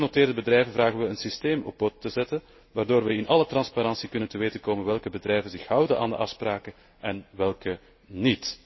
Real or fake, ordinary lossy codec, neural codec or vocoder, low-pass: real; MP3, 24 kbps; none; 7.2 kHz